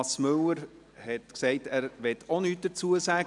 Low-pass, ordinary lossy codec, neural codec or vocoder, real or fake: 10.8 kHz; none; none; real